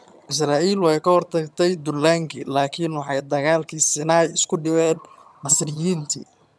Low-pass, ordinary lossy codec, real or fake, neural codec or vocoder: none; none; fake; vocoder, 22.05 kHz, 80 mel bands, HiFi-GAN